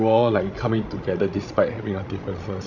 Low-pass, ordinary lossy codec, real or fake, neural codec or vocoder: 7.2 kHz; none; fake; codec, 16 kHz, 16 kbps, FreqCodec, larger model